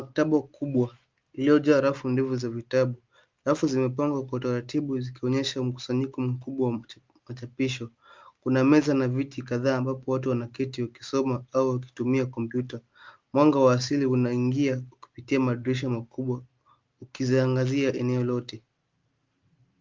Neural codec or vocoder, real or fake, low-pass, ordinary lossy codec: none; real; 7.2 kHz; Opus, 24 kbps